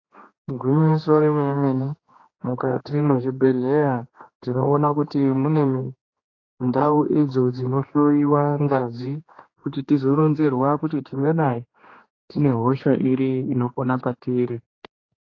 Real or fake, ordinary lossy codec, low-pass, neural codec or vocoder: fake; AAC, 32 kbps; 7.2 kHz; codec, 16 kHz, 2 kbps, X-Codec, HuBERT features, trained on general audio